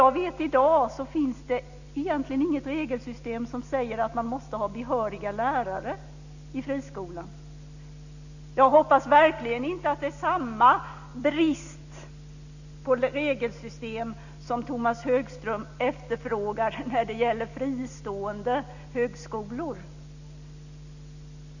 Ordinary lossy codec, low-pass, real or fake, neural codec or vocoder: none; 7.2 kHz; real; none